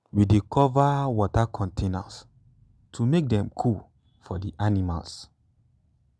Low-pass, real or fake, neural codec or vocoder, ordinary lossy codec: none; real; none; none